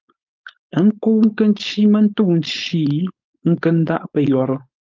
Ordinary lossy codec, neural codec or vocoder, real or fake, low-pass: Opus, 32 kbps; codec, 16 kHz, 4.8 kbps, FACodec; fake; 7.2 kHz